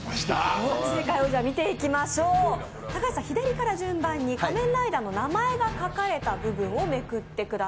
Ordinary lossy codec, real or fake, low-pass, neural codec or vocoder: none; real; none; none